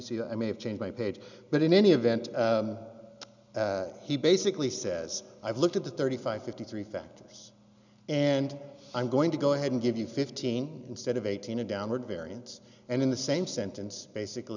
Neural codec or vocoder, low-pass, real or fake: none; 7.2 kHz; real